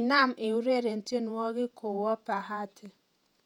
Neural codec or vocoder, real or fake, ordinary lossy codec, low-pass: vocoder, 48 kHz, 128 mel bands, Vocos; fake; none; 19.8 kHz